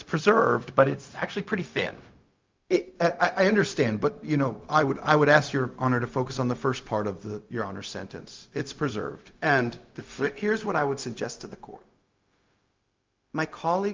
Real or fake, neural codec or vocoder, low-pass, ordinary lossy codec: fake; codec, 16 kHz, 0.4 kbps, LongCat-Audio-Codec; 7.2 kHz; Opus, 24 kbps